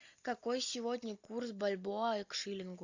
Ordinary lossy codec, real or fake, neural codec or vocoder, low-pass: AAC, 48 kbps; real; none; 7.2 kHz